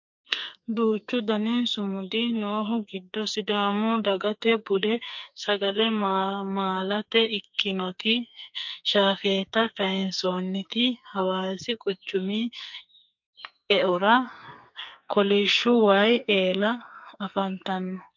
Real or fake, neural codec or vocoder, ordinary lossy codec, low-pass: fake; codec, 44.1 kHz, 2.6 kbps, SNAC; MP3, 48 kbps; 7.2 kHz